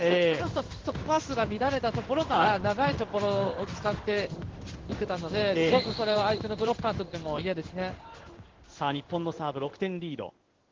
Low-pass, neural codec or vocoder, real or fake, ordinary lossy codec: 7.2 kHz; codec, 16 kHz in and 24 kHz out, 1 kbps, XY-Tokenizer; fake; Opus, 16 kbps